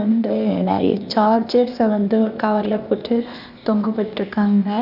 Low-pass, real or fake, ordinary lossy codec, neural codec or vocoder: 5.4 kHz; fake; none; codec, 16 kHz, 0.8 kbps, ZipCodec